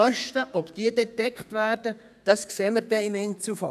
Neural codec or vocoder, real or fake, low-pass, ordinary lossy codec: codec, 32 kHz, 1.9 kbps, SNAC; fake; 14.4 kHz; none